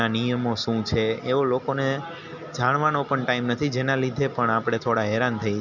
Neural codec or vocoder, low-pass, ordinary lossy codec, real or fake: none; 7.2 kHz; none; real